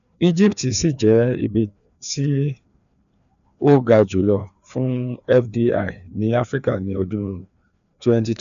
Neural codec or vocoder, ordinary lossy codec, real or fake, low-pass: codec, 16 kHz, 2 kbps, FreqCodec, larger model; none; fake; 7.2 kHz